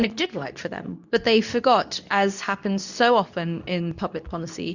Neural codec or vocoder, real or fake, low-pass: codec, 24 kHz, 0.9 kbps, WavTokenizer, medium speech release version 2; fake; 7.2 kHz